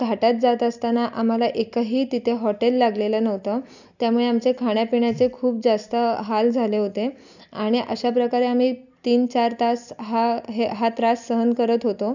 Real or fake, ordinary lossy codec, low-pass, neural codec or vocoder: real; none; 7.2 kHz; none